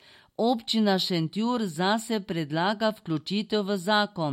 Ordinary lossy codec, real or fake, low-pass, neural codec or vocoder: MP3, 64 kbps; real; 19.8 kHz; none